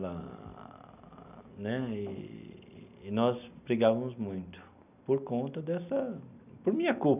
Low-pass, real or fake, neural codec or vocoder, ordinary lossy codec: 3.6 kHz; real; none; none